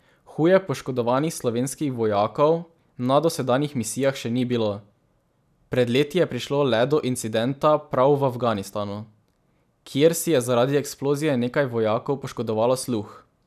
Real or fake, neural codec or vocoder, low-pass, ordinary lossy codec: real; none; 14.4 kHz; none